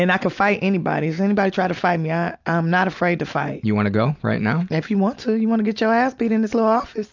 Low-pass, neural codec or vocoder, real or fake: 7.2 kHz; none; real